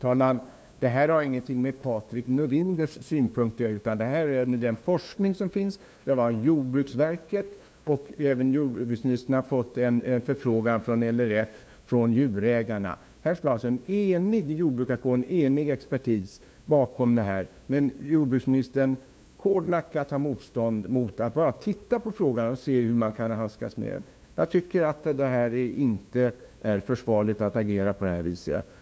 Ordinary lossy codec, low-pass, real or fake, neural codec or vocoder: none; none; fake; codec, 16 kHz, 2 kbps, FunCodec, trained on LibriTTS, 25 frames a second